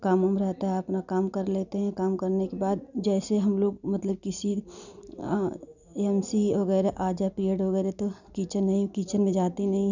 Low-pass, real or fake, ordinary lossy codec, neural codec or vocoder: 7.2 kHz; real; none; none